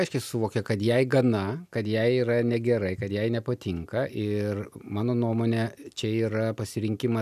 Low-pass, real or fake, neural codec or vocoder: 14.4 kHz; real; none